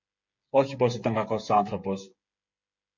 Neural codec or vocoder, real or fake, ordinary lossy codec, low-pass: codec, 16 kHz, 8 kbps, FreqCodec, smaller model; fake; MP3, 48 kbps; 7.2 kHz